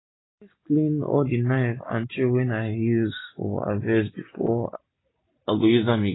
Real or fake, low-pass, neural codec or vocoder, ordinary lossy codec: real; 7.2 kHz; none; AAC, 16 kbps